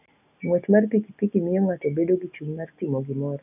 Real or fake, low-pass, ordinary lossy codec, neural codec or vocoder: real; 3.6 kHz; none; none